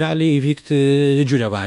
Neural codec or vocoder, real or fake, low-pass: codec, 24 kHz, 1.2 kbps, DualCodec; fake; 10.8 kHz